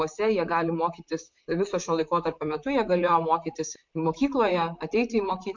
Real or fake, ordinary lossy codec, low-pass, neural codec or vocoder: fake; MP3, 64 kbps; 7.2 kHz; vocoder, 44.1 kHz, 128 mel bands, Pupu-Vocoder